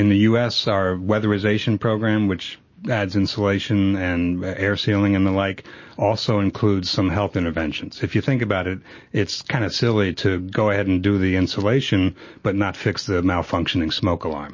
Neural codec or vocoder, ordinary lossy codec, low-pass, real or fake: none; MP3, 32 kbps; 7.2 kHz; real